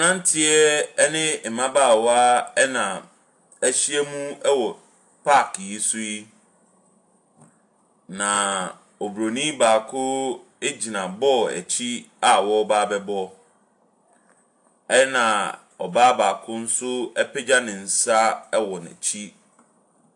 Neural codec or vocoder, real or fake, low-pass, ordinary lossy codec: none; real; 10.8 kHz; AAC, 64 kbps